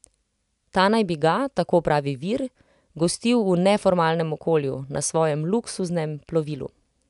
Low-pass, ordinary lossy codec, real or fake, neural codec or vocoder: 10.8 kHz; none; real; none